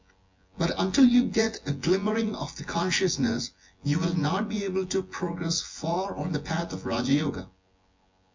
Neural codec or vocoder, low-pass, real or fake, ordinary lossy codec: vocoder, 24 kHz, 100 mel bands, Vocos; 7.2 kHz; fake; MP3, 48 kbps